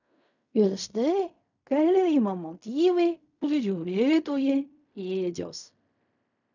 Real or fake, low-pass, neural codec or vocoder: fake; 7.2 kHz; codec, 16 kHz in and 24 kHz out, 0.4 kbps, LongCat-Audio-Codec, fine tuned four codebook decoder